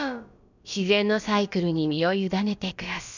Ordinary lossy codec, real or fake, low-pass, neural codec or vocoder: none; fake; 7.2 kHz; codec, 16 kHz, about 1 kbps, DyCAST, with the encoder's durations